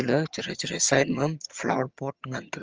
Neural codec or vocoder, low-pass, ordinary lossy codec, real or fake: vocoder, 22.05 kHz, 80 mel bands, HiFi-GAN; 7.2 kHz; Opus, 24 kbps; fake